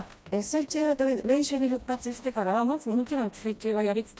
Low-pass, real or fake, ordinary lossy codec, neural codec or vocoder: none; fake; none; codec, 16 kHz, 1 kbps, FreqCodec, smaller model